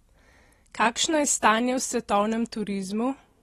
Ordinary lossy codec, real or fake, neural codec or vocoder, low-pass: AAC, 32 kbps; real; none; 19.8 kHz